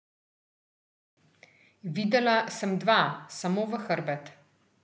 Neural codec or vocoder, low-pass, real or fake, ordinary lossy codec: none; none; real; none